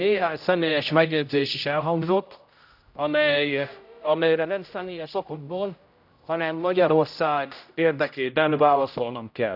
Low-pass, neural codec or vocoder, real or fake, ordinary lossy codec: 5.4 kHz; codec, 16 kHz, 0.5 kbps, X-Codec, HuBERT features, trained on general audio; fake; none